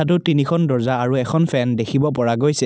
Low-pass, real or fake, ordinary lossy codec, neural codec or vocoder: none; real; none; none